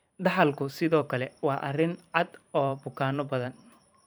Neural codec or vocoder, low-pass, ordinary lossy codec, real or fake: vocoder, 44.1 kHz, 128 mel bands every 512 samples, BigVGAN v2; none; none; fake